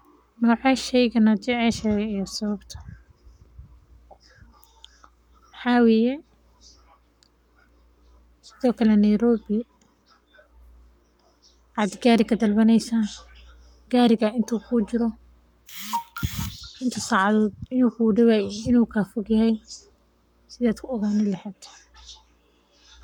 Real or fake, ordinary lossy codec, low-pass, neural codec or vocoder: fake; none; 19.8 kHz; codec, 44.1 kHz, 7.8 kbps, Pupu-Codec